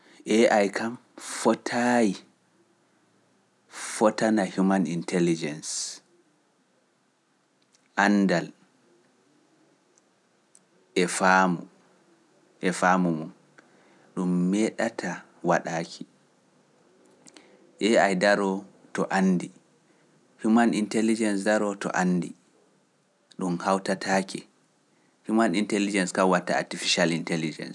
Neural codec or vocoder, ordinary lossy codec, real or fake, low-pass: none; none; real; none